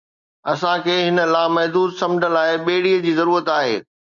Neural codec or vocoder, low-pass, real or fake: none; 7.2 kHz; real